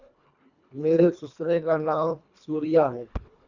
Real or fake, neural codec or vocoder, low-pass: fake; codec, 24 kHz, 1.5 kbps, HILCodec; 7.2 kHz